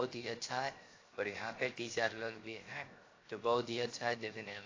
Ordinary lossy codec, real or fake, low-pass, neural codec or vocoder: AAC, 32 kbps; fake; 7.2 kHz; codec, 16 kHz, 0.7 kbps, FocalCodec